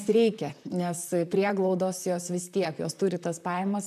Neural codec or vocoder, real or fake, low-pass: vocoder, 44.1 kHz, 128 mel bands, Pupu-Vocoder; fake; 14.4 kHz